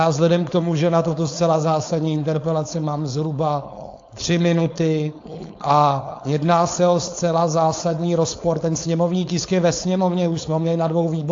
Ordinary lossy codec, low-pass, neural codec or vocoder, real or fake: AAC, 48 kbps; 7.2 kHz; codec, 16 kHz, 4.8 kbps, FACodec; fake